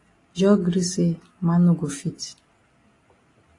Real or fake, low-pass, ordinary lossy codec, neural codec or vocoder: real; 10.8 kHz; AAC, 32 kbps; none